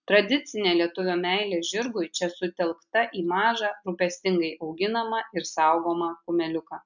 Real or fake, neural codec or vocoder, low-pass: real; none; 7.2 kHz